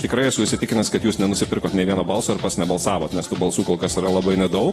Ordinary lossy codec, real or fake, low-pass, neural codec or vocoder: AAC, 32 kbps; fake; 19.8 kHz; vocoder, 48 kHz, 128 mel bands, Vocos